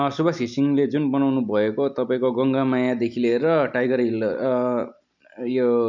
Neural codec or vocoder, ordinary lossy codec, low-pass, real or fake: none; none; 7.2 kHz; real